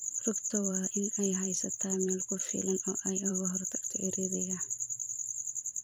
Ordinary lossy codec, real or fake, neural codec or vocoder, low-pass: none; fake; vocoder, 44.1 kHz, 128 mel bands every 512 samples, BigVGAN v2; none